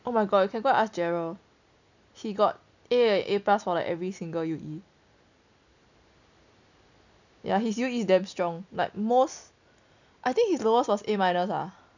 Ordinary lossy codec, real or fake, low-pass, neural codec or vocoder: none; real; 7.2 kHz; none